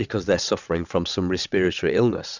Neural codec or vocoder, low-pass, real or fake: vocoder, 22.05 kHz, 80 mel bands, WaveNeXt; 7.2 kHz; fake